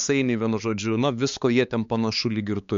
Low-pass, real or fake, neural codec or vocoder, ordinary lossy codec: 7.2 kHz; fake; codec, 16 kHz, 4 kbps, X-Codec, HuBERT features, trained on balanced general audio; MP3, 64 kbps